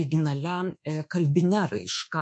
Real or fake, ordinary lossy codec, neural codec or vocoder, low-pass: fake; MP3, 48 kbps; codec, 24 kHz, 1.2 kbps, DualCodec; 9.9 kHz